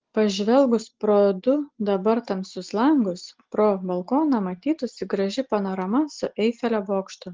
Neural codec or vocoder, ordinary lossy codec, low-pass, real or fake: none; Opus, 16 kbps; 7.2 kHz; real